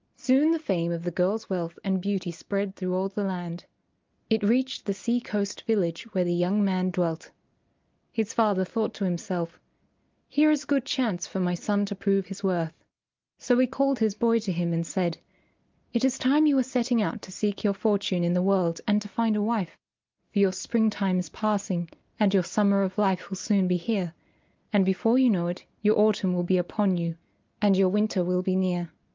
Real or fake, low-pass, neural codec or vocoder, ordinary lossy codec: real; 7.2 kHz; none; Opus, 24 kbps